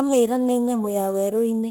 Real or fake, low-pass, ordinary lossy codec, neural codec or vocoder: fake; none; none; codec, 44.1 kHz, 1.7 kbps, Pupu-Codec